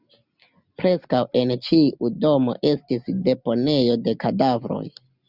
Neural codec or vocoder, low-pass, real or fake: none; 5.4 kHz; real